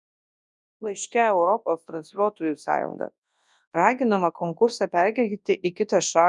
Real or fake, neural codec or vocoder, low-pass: fake; codec, 24 kHz, 0.9 kbps, WavTokenizer, large speech release; 10.8 kHz